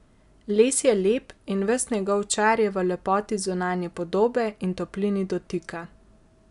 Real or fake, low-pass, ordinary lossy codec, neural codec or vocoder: real; 10.8 kHz; none; none